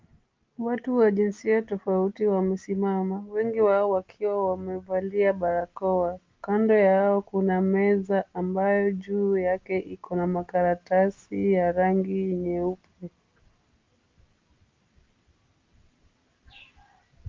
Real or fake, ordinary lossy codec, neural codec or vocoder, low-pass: real; Opus, 24 kbps; none; 7.2 kHz